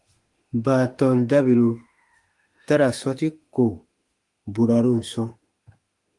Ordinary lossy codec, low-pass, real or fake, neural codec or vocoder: Opus, 24 kbps; 10.8 kHz; fake; autoencoder, 48 kHz, 32 numbers a frame, DAC-VAE, trained on Japanese speech